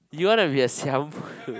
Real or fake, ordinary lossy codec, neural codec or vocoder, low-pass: real; none; none; none